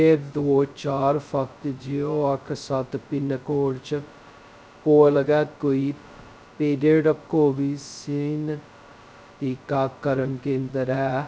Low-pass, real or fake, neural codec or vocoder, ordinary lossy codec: none; fake; codec, 16 kHz, 0.2 kbps, FocalCodec; none